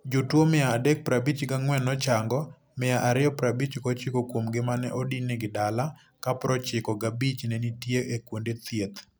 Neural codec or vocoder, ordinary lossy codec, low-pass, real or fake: none; none; none; real